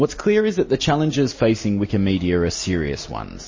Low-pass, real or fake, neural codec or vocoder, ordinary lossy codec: 7.2 kHz; real; none; MP3, 32 kbps